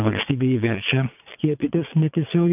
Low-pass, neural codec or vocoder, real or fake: 3.6 kHz; vocoder, 22.05 kHz, 80 mel bands, Vocos; fake